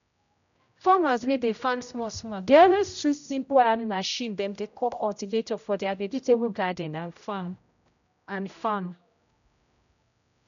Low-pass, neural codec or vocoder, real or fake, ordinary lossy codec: 7.2 kHz; codec, 16 kHz, 0.5 kbps, X-Codec, HuBERT features, trained on general audio; fake; none